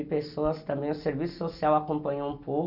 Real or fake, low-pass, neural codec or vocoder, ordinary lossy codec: real; 5.4 kHz; none; none